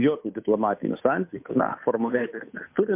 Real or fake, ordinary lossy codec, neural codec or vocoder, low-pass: fake; MP3, 32 kbps; codec, 16 kHz, 2 kbps, FunCodec, trained on Chinese and English, 25 frames a second; 3.6 kHz